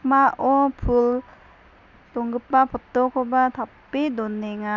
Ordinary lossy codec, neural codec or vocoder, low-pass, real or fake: none; none; 7.2 kHz; real